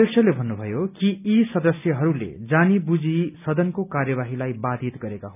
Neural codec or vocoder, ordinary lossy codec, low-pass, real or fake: none; none; 3.6 kHz; real